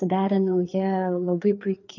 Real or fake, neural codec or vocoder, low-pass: fake; codec, 16 kHz, 4 kbps, FreqCodec, larger model; 7.2 kHz